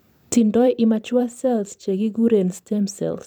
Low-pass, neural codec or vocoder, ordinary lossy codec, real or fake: 19.8 kHz; vocoder, 44.1 kHz, 128 mel bands every 512 samples, BigVGAN v2; none; fake